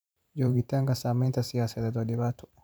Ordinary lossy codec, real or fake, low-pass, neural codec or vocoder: none; fake; none; vocoder, 44.1 kHz, 128 mel bands every 512 samples, BigVGAN v2